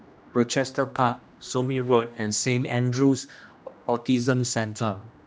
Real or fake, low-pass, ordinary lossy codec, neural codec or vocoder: fake; none; none; codec, 16 kHz, 1 kbps, X-Codec, HuBERT features, trained on general audio